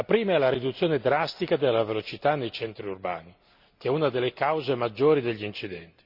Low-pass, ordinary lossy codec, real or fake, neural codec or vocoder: 5.4 kHz; Opus, 64 kbps; real; none